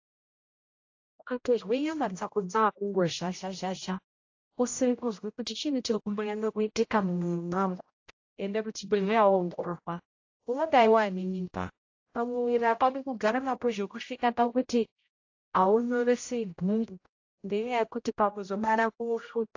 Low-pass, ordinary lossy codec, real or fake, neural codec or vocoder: 7.2 kHz; AAC, 48 kbps; fake; codec, 16 kHz, 0.5 kbps, X-Codec, HuBERT features, trained on general audio